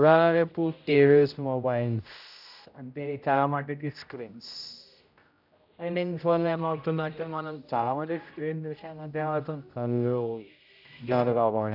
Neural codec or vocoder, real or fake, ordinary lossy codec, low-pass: codec, 16 kHz, 0.5 kbps, X-Codec, HuBERT features, trained on general audio; fake; none; 5.4 kHz